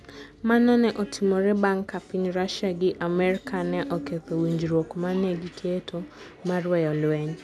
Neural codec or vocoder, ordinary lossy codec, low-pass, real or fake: none; none; none; real